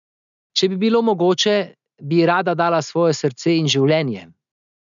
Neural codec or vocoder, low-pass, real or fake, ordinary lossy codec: none; 7.2 kHz; real; none